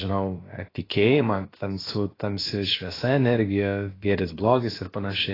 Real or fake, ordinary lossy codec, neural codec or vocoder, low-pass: fake; AAC, 24 kbps; codec, 16 kHz, about 1 kbps, DyCAST, with the encoder's durations; 5.4 kHz